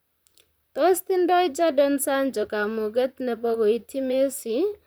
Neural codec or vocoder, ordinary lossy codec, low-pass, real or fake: vocoder, 44.1 kHz, 128 mel bands, Pupu-Vocoder; none; none; fake